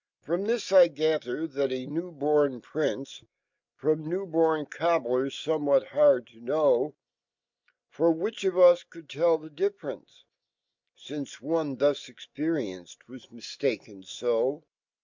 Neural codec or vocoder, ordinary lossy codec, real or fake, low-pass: none; MP3, 64 kbps; real; 7.2 kHz